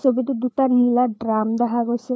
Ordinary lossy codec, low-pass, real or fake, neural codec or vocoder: none; none; fake; codec, 16 kHz, 4 kbps, FreqCodec, larger model